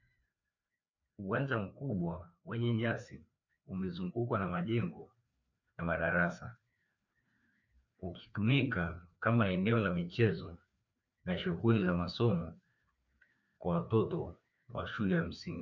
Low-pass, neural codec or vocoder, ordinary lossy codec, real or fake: 7.2 kHz; codec, 16 kHz, 2 kbps, FreqCodec, larger model; MP3, 64 kbps; fake